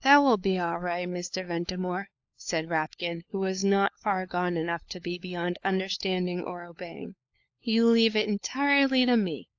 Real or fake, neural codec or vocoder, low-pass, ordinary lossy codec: fake; codec, 16 kHz, 4 kbps, FunCodec, trained on LibriTTS, 50 frames a second; 7.2 kHz; AAC, 48 kbps